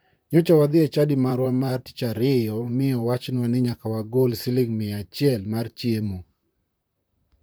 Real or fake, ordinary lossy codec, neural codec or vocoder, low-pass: fake; none; vocoder, 44.1 kHz, 128 mel bands, Pupu-Vocoder; none